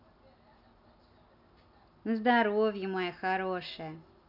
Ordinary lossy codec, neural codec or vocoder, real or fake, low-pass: none; none; real; 5.4 kHz